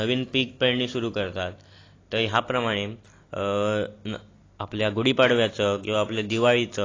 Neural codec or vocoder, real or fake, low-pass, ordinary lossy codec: none; real; 7.2 kHz; AAC, 32 kbps